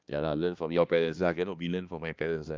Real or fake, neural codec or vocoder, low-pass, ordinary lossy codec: fake; codec, 16 kHz, 2 kbps, X-Codec, HuBERT features, trained on balanced general audio; 7.2 kHz; Opus, 24 kbps